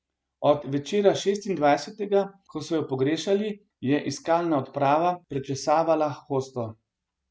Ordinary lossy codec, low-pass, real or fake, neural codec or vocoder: none; none; real; none